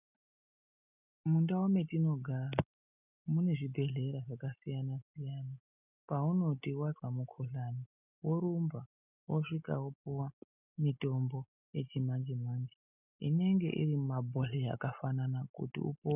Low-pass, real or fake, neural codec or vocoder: 3.6 kHz; real; none